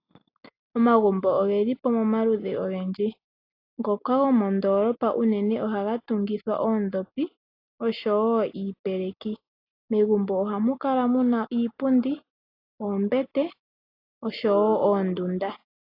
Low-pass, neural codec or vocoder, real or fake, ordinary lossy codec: 5.4 kHz; none; real; AAC, 32 kbps